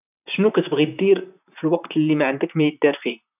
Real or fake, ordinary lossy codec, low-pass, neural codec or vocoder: fake; none; 3.6 kHz; vocoder, 44.1 kHz, 128 mel bands, Pupu-Vocoder